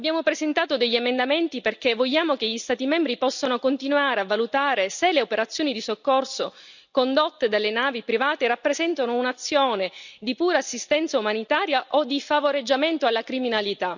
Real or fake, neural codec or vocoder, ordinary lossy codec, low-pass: real; none; none; 7.2 kHz